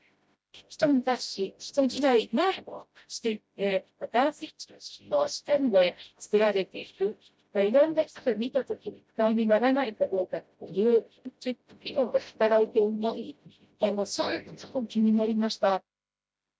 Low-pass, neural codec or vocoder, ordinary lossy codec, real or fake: none; codec, 16 kHz, 0.5 kbps, FreqCodec, smaller model; none; fake